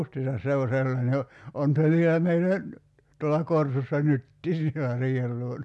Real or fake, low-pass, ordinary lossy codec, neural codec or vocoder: real; none; none; none